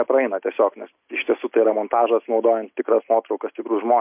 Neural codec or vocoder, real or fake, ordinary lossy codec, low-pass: none; real; MP3, 32 kbps; 3.6 kHz